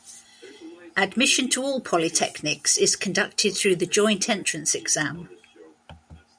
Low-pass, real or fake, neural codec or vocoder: 9.9 kHz; real; none